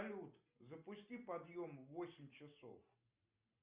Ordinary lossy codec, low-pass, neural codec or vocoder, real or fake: Opus, 64 kbps; 3.6 kHz; none; real